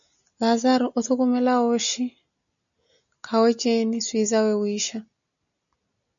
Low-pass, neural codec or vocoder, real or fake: 7.2 kHz; none; real